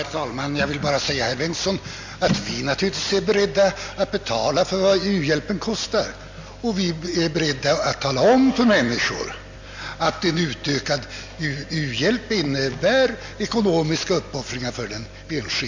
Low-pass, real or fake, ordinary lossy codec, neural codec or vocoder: 7.2 kHz; real; MP3, 48 kbps; none